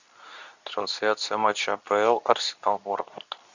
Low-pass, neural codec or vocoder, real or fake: 7.2 kHz; codec, 24 kHz, 0.9 kbps, WavTokenizer, medium speech release version 2; fake